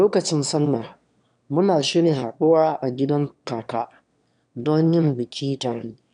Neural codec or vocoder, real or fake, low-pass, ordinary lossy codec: autoencoder, 22.05 kHz, a latent of 192 numbers a frame, VITS, trained on one speaker; fake; 9.9 kHz; none